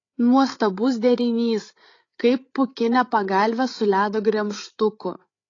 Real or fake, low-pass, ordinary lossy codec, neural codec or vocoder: fake; 7.2 kHz; AAC, 32 kbps; codec, 16 kHz, 8 kbps, FreqCodec, larger model